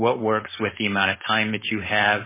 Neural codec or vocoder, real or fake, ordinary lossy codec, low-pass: codec, 16 kHz, 4.8 kbps, FACodec; fake; MP3, 16 kbps; 3.6 kHz